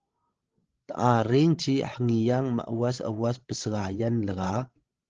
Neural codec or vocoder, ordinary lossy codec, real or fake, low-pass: codec, 16 kHz, 16 kbps, FreqCodec, larger model; Opus, 16 kbps; fake; 7.2 kHz